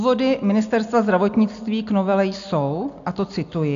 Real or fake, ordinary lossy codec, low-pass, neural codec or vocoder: real; AAC, 64 kbps; 7.2 kHz; none